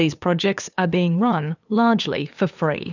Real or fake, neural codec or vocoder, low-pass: fake; codec, 16 kHz, 2 kbps, FunCodec, trained on LibriTTS, 25 frames a second; 7.2 kHz